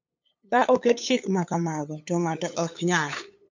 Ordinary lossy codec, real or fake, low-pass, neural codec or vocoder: MP3, 48 kbps; fake; 7.2 kHz; codec, 16 kHz, 8 kbps, FunCodec, trained on LibriTTS, 25 frames a second